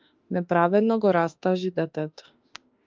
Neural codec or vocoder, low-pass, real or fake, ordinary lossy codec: autoencoder, 48 kHz, 32 numbers a frame, DAC-VAE, trained on Japanese speech; 7.2 kHz; fake; Opus, 24 kbps